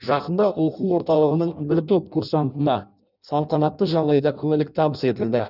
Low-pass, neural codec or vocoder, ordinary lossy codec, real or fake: 5.4 kHz; codec, 16 kHz in and 24 kHz out, 0.6 kbps, FireRedTTS-2 codec; none; fake